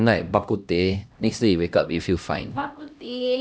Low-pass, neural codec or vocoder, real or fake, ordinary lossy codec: none; codec, 16 kHz, 1 kbps, X-Codec, HuBERT features, trained on LibriSpeech; fake; none